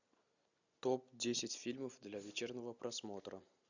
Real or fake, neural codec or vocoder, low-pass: real; none; 7.2 kHz